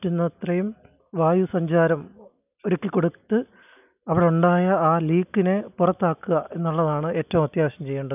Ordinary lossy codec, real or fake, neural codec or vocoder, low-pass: none; real; none; 3.6 kHz